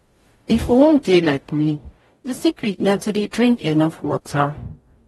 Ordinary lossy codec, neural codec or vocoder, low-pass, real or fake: AAC, 32 kbps; codec, 44.1 kHz, 0.9 kbps, DAC; 19.8 kHz; fake